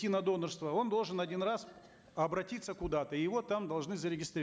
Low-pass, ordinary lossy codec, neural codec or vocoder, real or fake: none; none; none; real